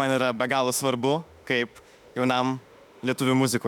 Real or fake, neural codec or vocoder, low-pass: fake; autoencoder, 48 kHz, 32 numbers a frame, DAC-VAE, trained on Japanese speech; 19.8 kHz